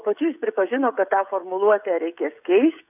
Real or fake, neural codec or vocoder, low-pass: fake; codec, 16 kHz, 16 kbps, FreqCodec, smaller model; 3.6 kHz